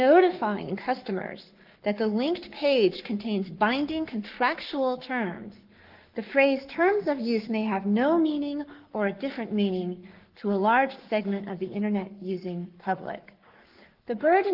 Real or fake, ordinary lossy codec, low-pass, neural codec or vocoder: fake; Opus, 24 kbps; 5.4 kHz; codec, 44.1 kHz, 7.8 kbps, Pupu-Codec